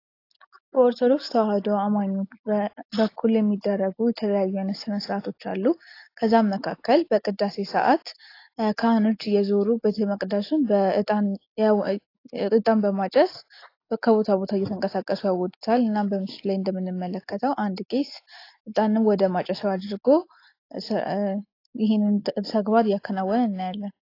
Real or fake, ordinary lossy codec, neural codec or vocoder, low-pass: real; AAC, 32 kbps; none; 5.4 kHz